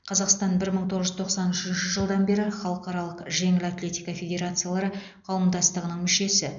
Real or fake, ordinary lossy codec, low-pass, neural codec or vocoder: real; none; 7.2 kHz; none